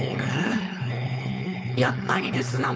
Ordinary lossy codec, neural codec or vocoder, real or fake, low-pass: none; codec, 16 kHz, 4.8 kbps, FACodec; fake; none